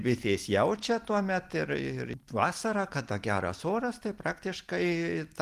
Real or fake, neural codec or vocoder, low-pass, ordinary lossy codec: real; none; 14.4 kHz; Opus, 24 kbps